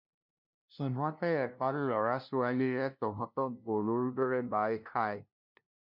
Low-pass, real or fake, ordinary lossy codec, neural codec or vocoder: 5.4 kHz; fake; MP3, 48 kbps; codec, 16 kHz, 0.5 kbps, FunCodec, trained on LibriTTS, 25 frames a second